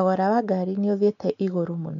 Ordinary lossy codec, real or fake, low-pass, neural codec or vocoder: none; real; 7.2 kHz; none